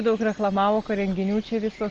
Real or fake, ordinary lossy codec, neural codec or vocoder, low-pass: real; Opus, 24 kbps; none; 7.2 kHz